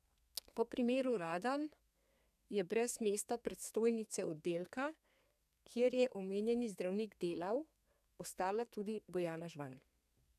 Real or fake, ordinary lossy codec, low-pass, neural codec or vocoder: fake; none; 14.4 kHz; codec, 32 kHz, 1.9 kbps, SNAC